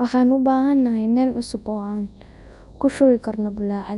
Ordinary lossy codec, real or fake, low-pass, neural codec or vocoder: none; fake; 10.8 kHz; codec, 24 kHz, 0.9 kbps, WavTokenizer, large speech release